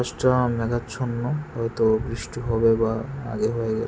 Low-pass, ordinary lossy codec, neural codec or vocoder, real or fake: none; none; none; real